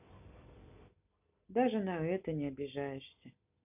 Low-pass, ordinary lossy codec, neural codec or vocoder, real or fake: 3.6 kHz; none; none; real